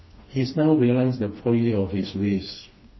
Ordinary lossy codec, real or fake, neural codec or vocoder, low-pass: MP3, 24 kbps; fake; codec, 16 kHz, 2 kbps, FreqCodec, smaller model; 7.2 kHz